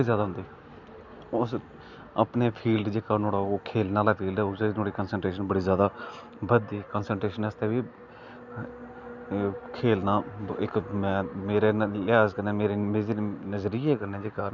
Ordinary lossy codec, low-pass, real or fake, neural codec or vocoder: none; 7.2 kHz; real; none